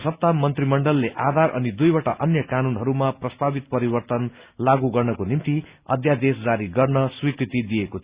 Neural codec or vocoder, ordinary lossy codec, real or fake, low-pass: none; Opus, 64 kbps; real; 3.6 kHz